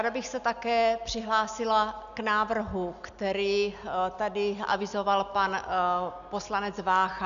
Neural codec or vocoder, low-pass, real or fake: none; 7.2 kHz; real